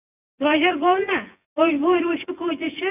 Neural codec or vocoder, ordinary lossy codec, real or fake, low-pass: vocoder, 24 kHz, 100 mel bands, Vocos; none; fake; 3.6 kHz